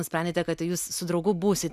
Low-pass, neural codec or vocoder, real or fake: 14.4 kHz; none; real